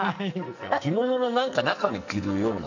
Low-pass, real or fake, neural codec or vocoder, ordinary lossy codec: 7.2 kHz; fake; codec, 44.1 kHz, 2.6 kbps, SNAC; none